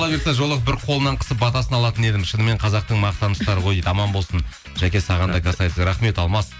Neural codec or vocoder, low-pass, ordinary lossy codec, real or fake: none; none; none; real